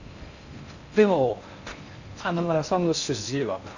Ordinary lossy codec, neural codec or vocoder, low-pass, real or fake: none; codec, 16 kHz in and 24 kHz out, 0.6 kbps, FocalCodec, streaming, 4096 codes; 7.2 kHz; fake